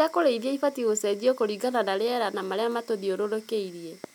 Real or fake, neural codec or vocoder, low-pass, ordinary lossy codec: real; none; 19.8 kHz; none